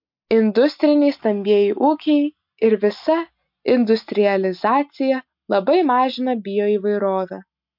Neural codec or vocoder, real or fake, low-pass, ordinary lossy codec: none; real; 5.4 kHz; MP3, 48 kbps